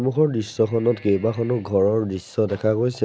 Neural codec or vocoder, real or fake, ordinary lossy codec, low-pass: none; real; none; none